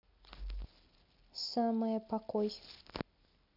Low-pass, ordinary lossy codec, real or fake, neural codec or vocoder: 5.4 kHz; none; real; none